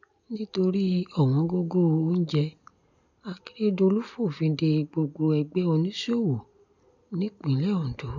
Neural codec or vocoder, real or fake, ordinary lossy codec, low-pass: none; real; none; 7.2 kHz